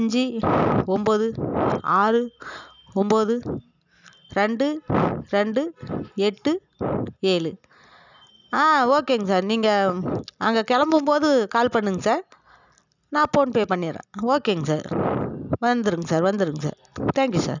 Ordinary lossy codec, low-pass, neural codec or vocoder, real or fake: none; 7.2 kHz; none; real